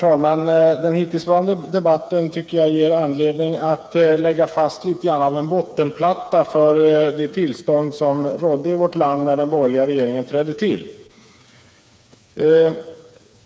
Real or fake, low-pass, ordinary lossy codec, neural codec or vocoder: fake; none; none; codec, 16 kHz, 4 kbps, FreqCodec, smaller model